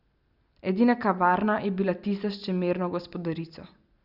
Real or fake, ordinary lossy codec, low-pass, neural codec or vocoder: real; Opus, 64 kbps; 5.4 kHz; none